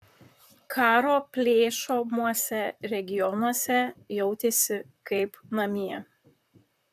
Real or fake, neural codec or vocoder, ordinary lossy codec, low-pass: fake; vocoder, 44.1 kHz, 128 mel bands, Pupu-Vocoder; AAC, 96 kbps; 14.4 kHz